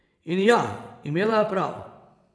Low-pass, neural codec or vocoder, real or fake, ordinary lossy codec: none; vocoder, 22.05 kHz, 80 mel bands, WaveNeXt; fake; none